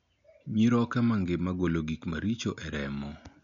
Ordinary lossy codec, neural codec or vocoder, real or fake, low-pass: none; none; real; 7.2 kHz